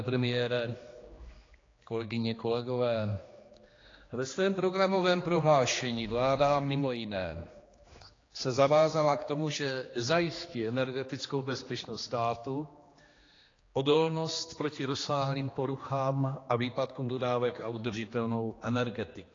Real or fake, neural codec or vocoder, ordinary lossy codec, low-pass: fake; codec, 16 kHz, 2 kbps, X-Codec, HuBERT features, trained on general audio; AAC, 32 kbps; 7.2 kHz